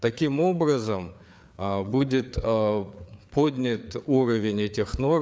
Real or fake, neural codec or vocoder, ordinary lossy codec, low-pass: fake; codec, 16 kHz, 4 kbps, FreqCodec, larger model; none; none